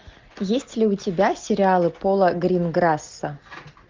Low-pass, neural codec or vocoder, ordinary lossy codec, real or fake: 7.2 kHz; none; Opus, 24 kbps; real